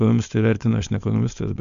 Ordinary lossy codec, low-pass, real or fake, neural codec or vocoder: AAC, 96 kbps; 7.2 kHz; fake; codec, 16 kHz, 4.8 kbps, FACodec